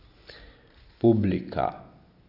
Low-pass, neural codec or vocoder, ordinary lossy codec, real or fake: 5.4 kHz; none; AAC, 48 kbps; real